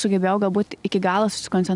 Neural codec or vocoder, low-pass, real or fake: none; 10.8 kHz; real